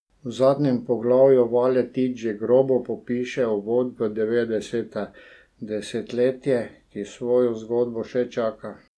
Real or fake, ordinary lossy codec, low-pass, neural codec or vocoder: real; none; none; none